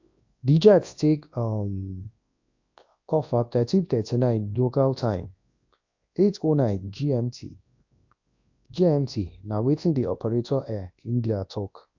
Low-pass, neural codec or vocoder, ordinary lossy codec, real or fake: 7.2 kHz; codec, 24 kHz, 0.9 kbps, WavTokenizer, large speech release; AAC, 48 kbps; fake